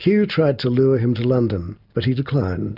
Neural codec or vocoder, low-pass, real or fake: none; 5.4 kHz; real